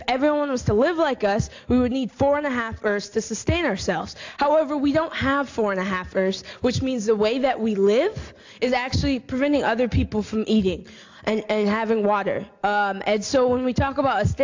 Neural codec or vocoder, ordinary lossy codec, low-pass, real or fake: none; AAC, 48 kbps; 7.2 kHz; real